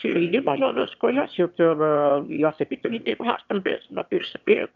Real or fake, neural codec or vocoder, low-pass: fake; autoencoder, 22.05 kHz, a latent of 192 numbers a frame, VITS, trained on one speaker; 7.2 kHz